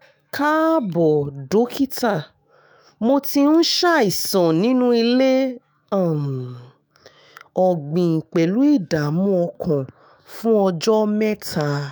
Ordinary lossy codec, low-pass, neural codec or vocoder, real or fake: none; none; autoencoder, 48 kHz, 128 numbers a frame, DAC-VAE, trained on Japanese speech; fake